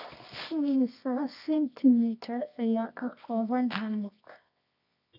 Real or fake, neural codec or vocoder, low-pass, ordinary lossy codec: fake; codec, 24 kHz, 0.9 kbps, WavTokenizer, medium music audio release; 5.4 kHz; none